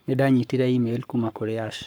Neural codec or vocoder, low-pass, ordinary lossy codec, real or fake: codec, 44.1 kHz, 7.8 kbps, Pupu-Codec; none; none; fake